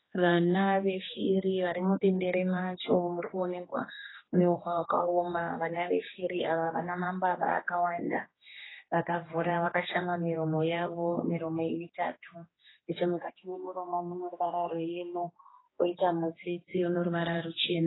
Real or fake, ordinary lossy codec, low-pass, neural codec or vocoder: fake; AAC, 16 kbps; 7.2 kHz; codec, 16 kHz, 2 kbps, X-Codec, HuBERT features, trained on general audio